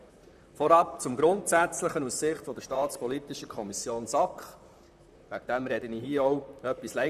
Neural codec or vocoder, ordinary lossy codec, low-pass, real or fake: vocoder, 44.1 kHz, 128 mel bands, Pupu-Vocoder; none; 14.4 kHz; fake